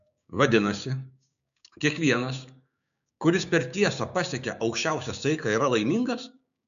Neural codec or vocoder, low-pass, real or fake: codec, 16 kHz, 6 kbps, DAC; 7.2 kHz; fake